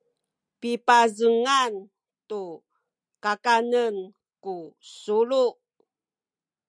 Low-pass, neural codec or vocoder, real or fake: 9.9 kHz; none; real